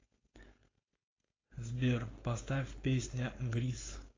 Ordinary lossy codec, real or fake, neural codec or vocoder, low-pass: AAC, 32 kbps; fake; codec, 16 kHz, 4.8 kbps, FACodec; 7.2 kHz